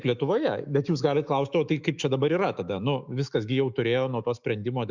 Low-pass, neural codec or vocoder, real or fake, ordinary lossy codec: 7.2 kHz; autoencoder, 48 kHz, 128 numbers a frame, DAC-VAE, trained on Japanese speech; fake; Opus, 64 kbps